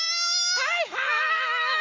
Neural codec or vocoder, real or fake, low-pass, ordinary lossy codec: autoencoder, 48 kHz, 128 numbers a frame, DAC-VAE, trained on Japanese speech; fake; 7.2 kHz; Opus, 32 kbps